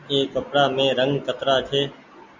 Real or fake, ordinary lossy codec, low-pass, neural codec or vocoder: real; Opus, 64 kbps; 7.2 kHz; none